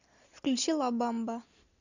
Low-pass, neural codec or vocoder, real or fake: 7.2 kHz; none; real